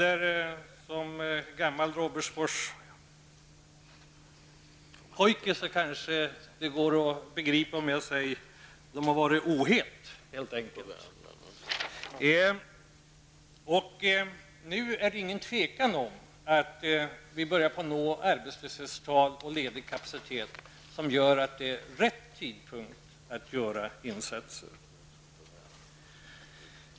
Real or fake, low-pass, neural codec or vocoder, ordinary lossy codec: real; none; none; none